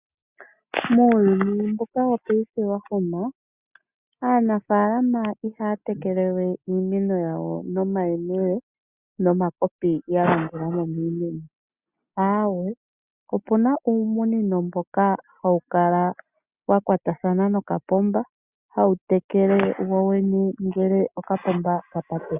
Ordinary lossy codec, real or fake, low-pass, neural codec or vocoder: Opus, 64 kbps; real; 3.6 kHz; none